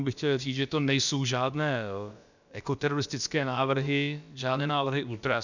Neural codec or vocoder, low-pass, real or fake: codec, 16 kHz, about 1 kbps, DyCAST, with the encoder's durations; 7.2 kHz; fake